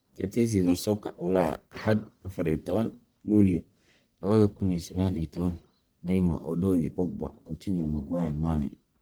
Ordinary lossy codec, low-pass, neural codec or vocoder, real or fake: none; none; codec, 44.1 kHz, 1.7 kbps, Pupu-Codec; fake